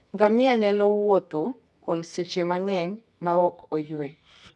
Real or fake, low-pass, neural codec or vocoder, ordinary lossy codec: fake; none; codec, 24 kHz, 0.9 kbps, WavTokenizer, medium music audio release; none